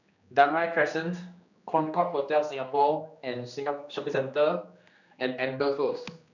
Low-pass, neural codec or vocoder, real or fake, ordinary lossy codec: 7.2 kHz; codec, 16 kHz, 2 kbps, X-Codec, HuBERT features, trained on general audio; fake; none